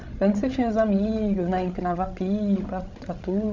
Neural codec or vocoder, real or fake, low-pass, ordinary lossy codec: codec, 16 kHz, 16 kbps, FreqCodec, larger model; fake; 7.2 kHz; MP3, 64 kbps